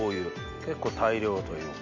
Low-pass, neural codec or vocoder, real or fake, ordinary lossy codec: 7.2 kHz; none; real; none